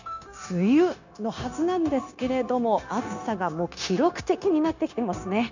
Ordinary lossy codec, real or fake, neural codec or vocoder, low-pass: none; fake; codec, 16 kHz, 0.9 kbps, LongCat-Audio-Codec; 7.2 kHz